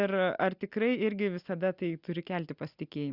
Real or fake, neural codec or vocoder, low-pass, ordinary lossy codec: real; none; 5.4 kHz; Opus, 64 kbps